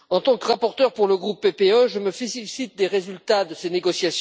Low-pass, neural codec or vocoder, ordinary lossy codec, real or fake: none; none; none; real